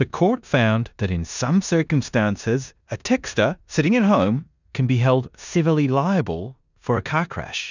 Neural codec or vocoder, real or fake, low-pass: codec, 16 kHz in and 24 kHz out, 0.9 kbps, LongCat-Audio-Codec, four codebook decoder; fake; 7.2 kHz